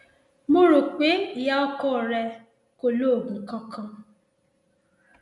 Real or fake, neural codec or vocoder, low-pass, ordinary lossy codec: real; none; 10.8 kHz; none